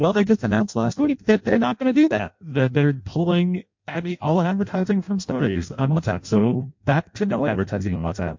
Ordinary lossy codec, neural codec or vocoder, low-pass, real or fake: MP3, 48 kbps; codec, 16 kHz in and 24 kHz out, 0.6 kbps, FireRedTTS-2 codec; 7.2 kHz; fake